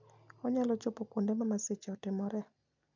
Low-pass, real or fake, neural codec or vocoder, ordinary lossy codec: 7.2 kHz; real; none; none